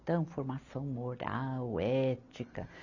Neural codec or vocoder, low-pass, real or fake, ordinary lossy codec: none; 7.2 kHz; real; none